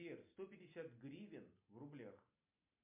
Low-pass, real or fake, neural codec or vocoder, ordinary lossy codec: 3.6 kHz; real; none; MP3, 32 kbps